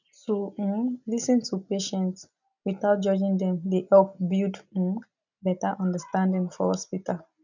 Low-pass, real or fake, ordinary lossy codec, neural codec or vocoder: 7.2 kHz; real; none; none